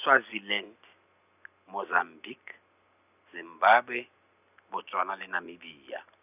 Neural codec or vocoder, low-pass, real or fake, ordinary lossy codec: none; 3.6 kHz; real; none